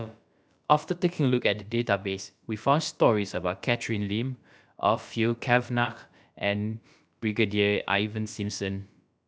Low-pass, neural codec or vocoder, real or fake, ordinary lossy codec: none; codec, 16 kHz, about 1 kbps, DyCAST, with the encoder's durations; fake; none